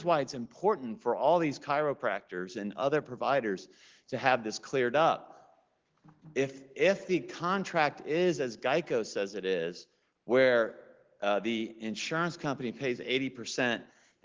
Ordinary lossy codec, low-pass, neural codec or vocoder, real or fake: Opus, 16 kbps; 7.2 kHz; none; real